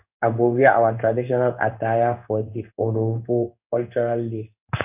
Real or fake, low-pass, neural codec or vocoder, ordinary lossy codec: fake; 3.6 kHz; codec, 16 kHz in and 24 kHz out, 1 kbps, XY-Tokenizer; none